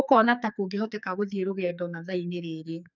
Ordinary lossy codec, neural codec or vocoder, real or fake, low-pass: none; codec, 44.1 kHz, 2.6 kbps, SNAC; fake; 7.2 kHz